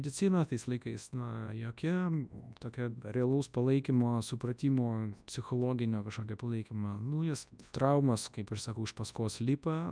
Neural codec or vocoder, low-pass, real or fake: codec, 24 kHz, 0.9 kbps, WavTokenizer, large speech release; 9.9 kHz; fake